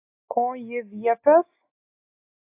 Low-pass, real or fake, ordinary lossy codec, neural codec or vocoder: 3.6 kHz; real; AAC, 24 kbps; none